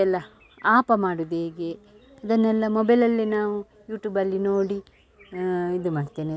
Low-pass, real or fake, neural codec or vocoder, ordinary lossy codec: none; real; none; none